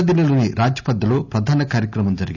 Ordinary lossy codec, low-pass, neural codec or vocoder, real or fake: none; 7.2 kHz; none; real